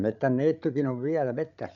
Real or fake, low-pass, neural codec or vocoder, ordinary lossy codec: fake; 7.2 kHz; codec, 16 kHz, 8 kbps, FreqCodec, larger model; none